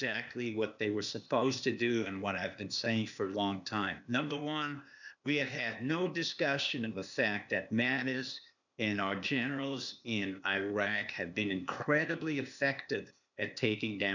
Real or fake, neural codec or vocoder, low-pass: fake; codec, 16 kHz, 0.8 kbps, ZipCodec; 7.2 kHz